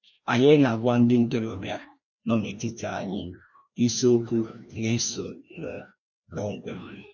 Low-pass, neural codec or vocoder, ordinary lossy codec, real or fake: 7.2 kHz; codec, 16 kHz, 1 kbps, FreqCodec, larger model; none; fake